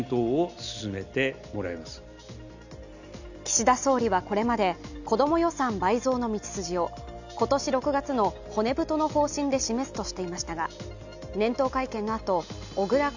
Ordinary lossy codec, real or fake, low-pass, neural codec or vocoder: none; real; 7.2 kHz; none